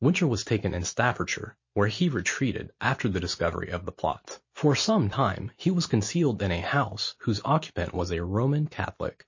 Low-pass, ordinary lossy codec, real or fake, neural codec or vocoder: 7.2 kHz; MP3, 32 kbps; real; none